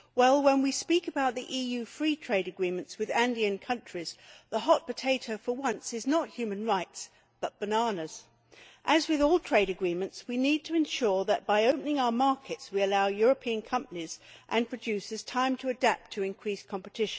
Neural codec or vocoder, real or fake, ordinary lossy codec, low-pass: none; real; none; none